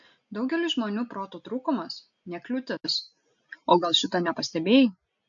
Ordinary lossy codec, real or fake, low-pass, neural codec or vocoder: AAC, 64 kbps; real; 7.2 kHz; none